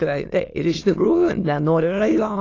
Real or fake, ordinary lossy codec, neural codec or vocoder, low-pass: fake; AAC, 32 kbps; autoencoder, 22.05 kHz, a latent of 192 numbers a frame, VITS, trained on many speakers; 7.2 kHz